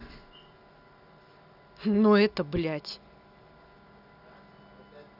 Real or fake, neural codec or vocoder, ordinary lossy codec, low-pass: real; none; none; 5.4 kHz